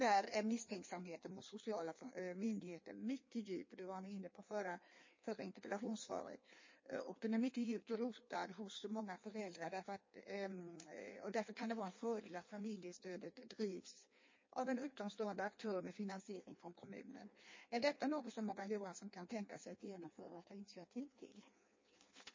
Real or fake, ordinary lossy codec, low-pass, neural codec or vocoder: fake; MP3, 32 kbps; 7.2 kHz; codec, 16 kHz in and 24 kHz out, 1.1 kbps, FireRedTTS-2 codec